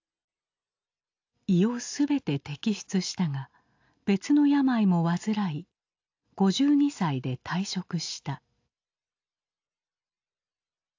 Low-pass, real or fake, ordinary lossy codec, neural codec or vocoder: 7.2 kHz; real; AAC, 48 kbps; none